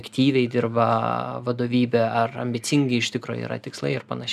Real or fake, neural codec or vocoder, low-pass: real; none; 14.4 kHz